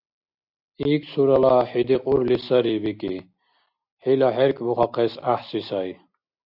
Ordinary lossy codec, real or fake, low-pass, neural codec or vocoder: AAC, 48 kbps; real; 5.4 kHz; none